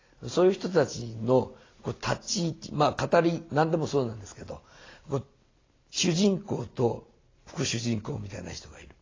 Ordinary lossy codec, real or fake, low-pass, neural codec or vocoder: AAC, 32 kbps; real; 7.2 kHz; none